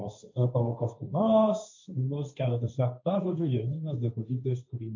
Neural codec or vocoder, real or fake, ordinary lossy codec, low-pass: codec, 16 kHz, 1.1 kbps, Voila-Tokenizer; fake; MP3, 64 kbps; 7.2 kHz